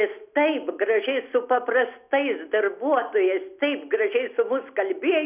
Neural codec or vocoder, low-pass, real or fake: none; 3.6 kHz; real